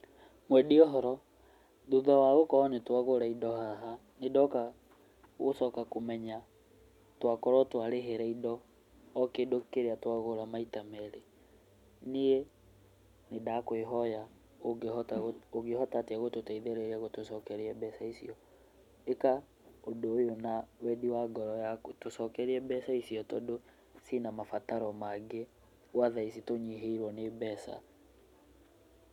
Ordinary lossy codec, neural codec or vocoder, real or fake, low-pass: none; none; real; 19.8 kHz